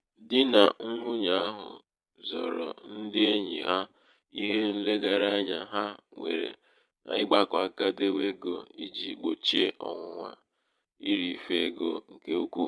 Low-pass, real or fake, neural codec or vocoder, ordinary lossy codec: none; fake; vocoder, 22.05 kHz, 80 mel bands, Vocos; none